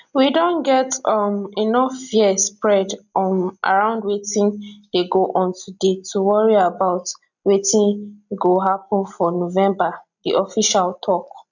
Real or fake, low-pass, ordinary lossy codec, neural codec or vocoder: real; 7.2 kHz; none; none